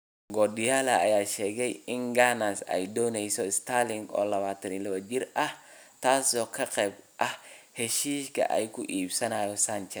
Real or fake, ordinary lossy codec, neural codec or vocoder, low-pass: real; none; none; none